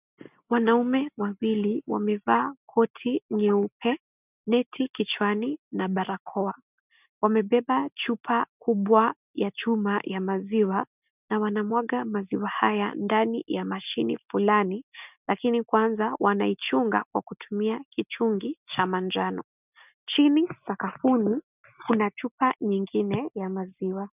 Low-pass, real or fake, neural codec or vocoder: 3.6 kHz; real; none